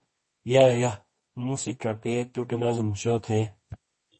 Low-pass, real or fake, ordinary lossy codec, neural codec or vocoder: 10.8 kHz; fake; MP3, 32 kbps; codec, 24 kHz, 0.9 kbps, WavTokenizer, medium music audio release